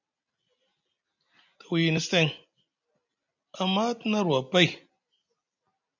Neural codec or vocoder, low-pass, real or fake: none; 7.2 kHz; real